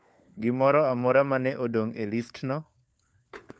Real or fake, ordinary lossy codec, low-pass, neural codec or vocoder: fake; none; none; codec, 16 kHz, 4 kbps, FunCodec, trained on LibriTTS, 50 frames a second